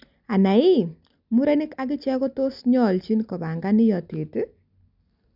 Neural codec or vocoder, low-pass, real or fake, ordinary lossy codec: none; 5.4 kHz; real; none